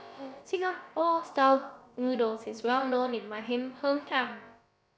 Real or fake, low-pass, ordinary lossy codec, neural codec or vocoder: fake; none; none; codec, 16 kHz, about 1 kbps, DyCAST, with the encoder's durations